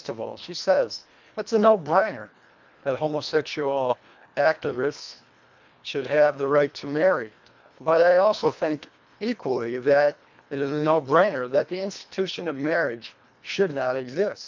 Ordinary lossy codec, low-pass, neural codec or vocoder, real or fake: MP3, 64 kbps; 7.2 kHz; codec, 24 kHz, 1.5 kbps, HILCodec; fake